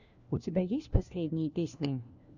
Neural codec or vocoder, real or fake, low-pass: codec, 16 kHz, 1 kbps, FunCodec, trained on LibriTTS, 50 frames a second; fake; 7.2 kHz